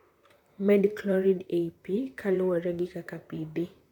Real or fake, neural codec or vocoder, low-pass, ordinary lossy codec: fake; vocoder, 44.1 kHz, 128 mel bands, Pupu-Vocoder; 19.8 kHz; none